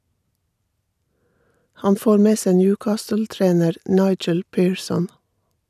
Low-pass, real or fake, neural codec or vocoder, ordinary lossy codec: 14.4 kHz; real; none; none